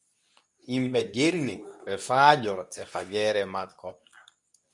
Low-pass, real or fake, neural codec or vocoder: 10.8 kHz; fake; codec, 24 kHz, 0.9 kbps, WavTokenizer, medium speech release version 2